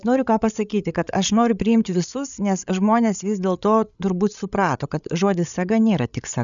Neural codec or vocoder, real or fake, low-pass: codec, 16 kHz, 8 kbps, FreqCodec, larger model; fake; 7.2 kHz